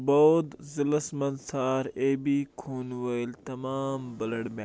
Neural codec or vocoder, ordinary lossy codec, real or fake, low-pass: none; none; real; none